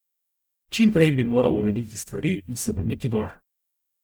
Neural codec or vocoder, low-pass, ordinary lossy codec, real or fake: codec, 44.1 kHz, 0.9 kbps, DAC; none; none; fake